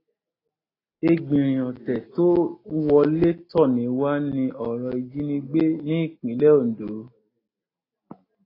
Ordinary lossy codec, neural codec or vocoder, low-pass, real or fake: AAC, 24 kbps; none; 5.4 kHz; real